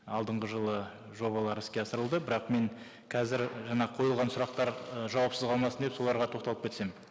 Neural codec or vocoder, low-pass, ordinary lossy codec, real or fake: none; none; none; real